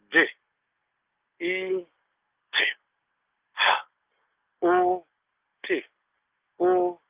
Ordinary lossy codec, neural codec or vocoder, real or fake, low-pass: Opus, 24 kbps; none; real; 3.6 kHz